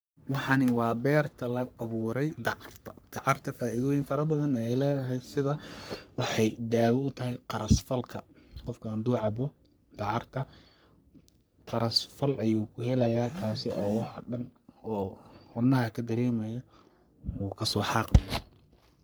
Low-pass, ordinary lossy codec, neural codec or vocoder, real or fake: none; none; codec, 44.1 kHz, 3.4 kbps, Pupu-Codec; fake